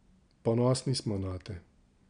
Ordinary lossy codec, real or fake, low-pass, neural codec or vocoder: none; real; 9.9 kHz; none